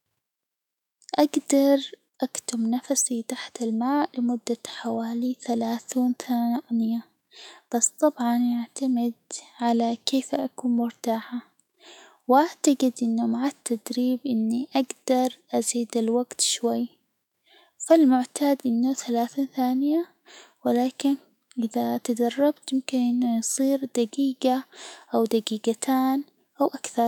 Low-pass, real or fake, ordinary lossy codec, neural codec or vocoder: 19.8 kHz; fake; none; autoencoder, 48 kHz, 128 numbers a frame, DAC-VAE, trained on Japanese speech